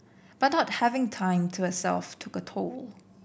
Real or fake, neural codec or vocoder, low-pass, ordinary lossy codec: real; none; none; none